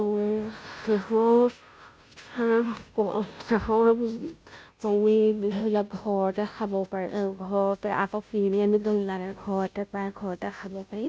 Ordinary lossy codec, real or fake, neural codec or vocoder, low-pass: none; fake; codec, 16 kHz, 0.5 kbps, FunCodec, trained on Chinese and English, 25 frames a second; none